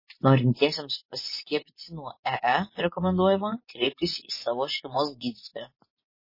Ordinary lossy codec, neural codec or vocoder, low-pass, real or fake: MP3, 24 kbps; vocoder, 24 kHz, 100 mel bands, Vocos; 5.4 kHz; fake